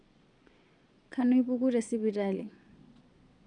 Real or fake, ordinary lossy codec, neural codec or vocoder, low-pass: fake; none; vocoder, 22.05 kHz, 80 mel bands, Vocos; 9.9 kHz